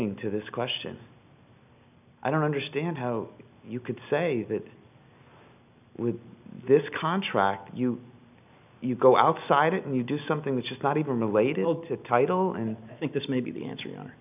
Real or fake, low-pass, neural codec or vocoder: real; 3.6 kHz; none